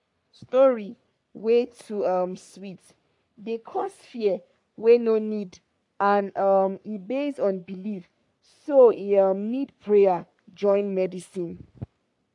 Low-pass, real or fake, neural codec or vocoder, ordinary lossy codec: 10.8 kHz; fake; codec, 44.1 kHz, 3.4 kbps, Pupu-Codec; AAC, 64 kbps